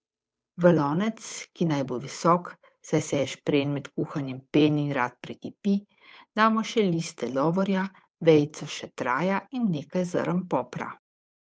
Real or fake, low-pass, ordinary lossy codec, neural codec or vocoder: fake; none; none; codec, 16 kHz, 8 kbps, FunCodec, trained on Chinese and English, 25 frames a second